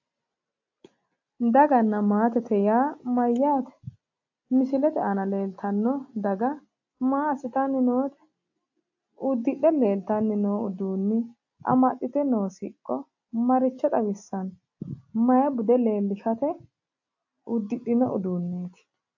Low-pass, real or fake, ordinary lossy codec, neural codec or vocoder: 7.2 kHz; real; AAC, 48 kbps; none